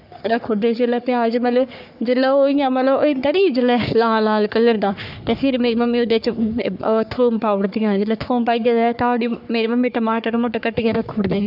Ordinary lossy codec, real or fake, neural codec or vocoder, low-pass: none; fake; codec, 44.1 kHz, 3.4 kbps, Pupu-Codec; 5.4 kHz